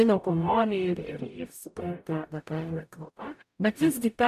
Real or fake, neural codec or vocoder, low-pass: fake; codec, 44.1 kHz, 0.9 kbps, DAC; 14.4 kHz